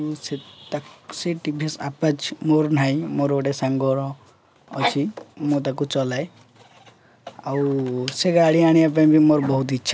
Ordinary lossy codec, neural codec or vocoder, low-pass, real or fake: none; none; none; real